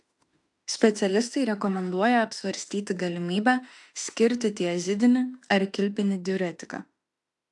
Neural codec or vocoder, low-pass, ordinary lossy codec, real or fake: autoencoder, 48 kHz, 32 numbers a frame, DAC-VAE, trained on Japanese speech; 10.8 kHz; AAC, 64 kbps; fake